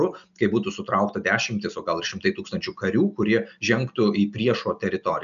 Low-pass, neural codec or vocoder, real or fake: 7.2 kHz; none; real